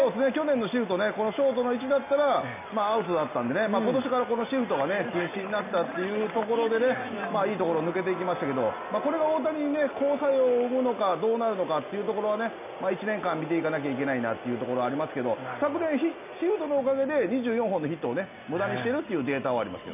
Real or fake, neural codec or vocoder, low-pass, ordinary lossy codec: real; none; 3.6 kHz; none